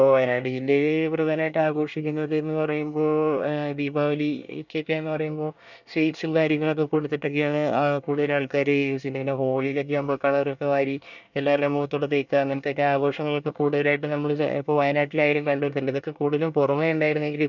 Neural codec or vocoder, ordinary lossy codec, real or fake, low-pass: codec, 24 kHz, 1 kbps, SNAC; none; fake; 7.2 kHz